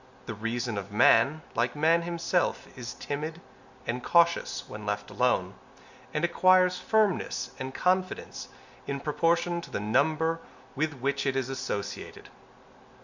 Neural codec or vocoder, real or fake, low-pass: none; real; 7.2 kHz